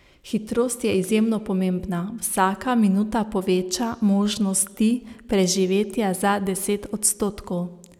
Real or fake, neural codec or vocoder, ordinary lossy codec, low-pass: real; none; none; 19.8 kHz